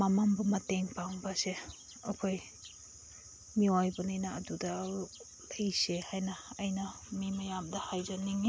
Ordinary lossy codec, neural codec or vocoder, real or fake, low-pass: none; none; real; none